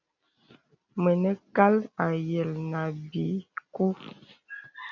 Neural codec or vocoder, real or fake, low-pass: none; real; 7.2 kHz